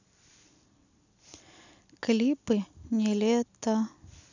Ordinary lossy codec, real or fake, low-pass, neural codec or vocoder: none; real; 7.2 kHz; none